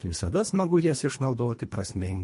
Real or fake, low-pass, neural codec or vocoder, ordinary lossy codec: fake; 10.8 kHz; codec, 24 kHz, 1.5 kbps, HILCodec; MP3, 48 kbps